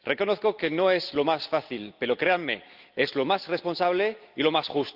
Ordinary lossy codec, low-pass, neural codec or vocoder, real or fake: Opus, 32 kbps; 5.4 kHz; none; real